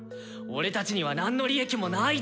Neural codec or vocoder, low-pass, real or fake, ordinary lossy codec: none; none; real; none